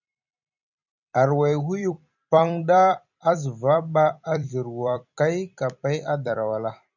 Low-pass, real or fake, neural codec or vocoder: 7.2 kHz; fake; vocoder, 44.1 kHz, 128 mel bands every 256 samples, BigVGAN v2